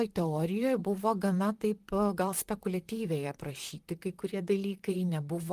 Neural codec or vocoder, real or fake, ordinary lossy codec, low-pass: vocoder, 44.1 kHz, 128 mel bands, Pupu-Vocoder; fake; Opus, 24 kbps; 14.4 kHz